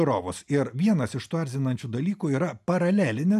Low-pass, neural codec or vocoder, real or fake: 14.4 kHz; none; real